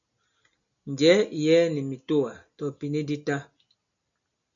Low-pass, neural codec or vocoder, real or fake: 7.2 kHz; none; real